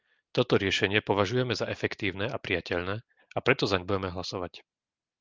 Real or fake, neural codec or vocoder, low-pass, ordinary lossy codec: real; none; 7.2 kHz; Opus, 32 kbps